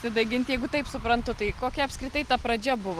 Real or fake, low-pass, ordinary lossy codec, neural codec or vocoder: real; 14.4 kHz; Opus, 24 kbps; none